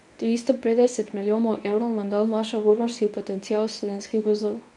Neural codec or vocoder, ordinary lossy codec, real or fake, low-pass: codec, 24 kHz, 0.9 kbps, WavTokenizer, medium speech release version 1; none; fake; 10.8 kHz